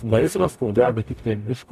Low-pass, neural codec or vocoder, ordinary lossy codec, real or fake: 14.4 kHz; codec, 44.1 kHz, 0.9 kbps, DAC; MP3, 96 kbps; fake